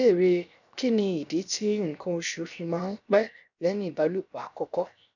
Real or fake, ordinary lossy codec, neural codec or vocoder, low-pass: fake; none; codec, 16 kHz, 0.7 kbps, FocalCodec; 7.2 kHz